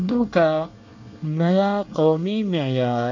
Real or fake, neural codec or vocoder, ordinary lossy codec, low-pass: fake; codec, 24 kHz, 1 kbps, SNAC; none; 7.2 kHz